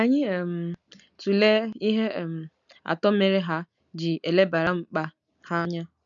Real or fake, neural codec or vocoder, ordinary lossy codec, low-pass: real; none; none; 7.2 kHz